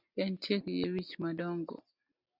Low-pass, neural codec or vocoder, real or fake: 5.4 kHz; none; real